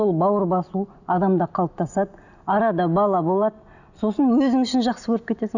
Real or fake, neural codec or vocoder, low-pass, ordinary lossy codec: real; none; 7.2 kHz; none